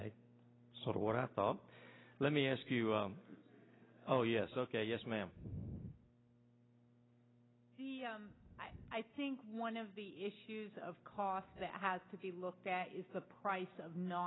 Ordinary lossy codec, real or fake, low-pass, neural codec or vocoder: AAC, 16 kbps; real; 7.2 kHz; none